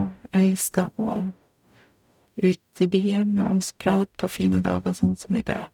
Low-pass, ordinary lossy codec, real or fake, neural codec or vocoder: 19.8 kHz; none; fake; codec, 44.1 kHz, 0.9 kbps, DAC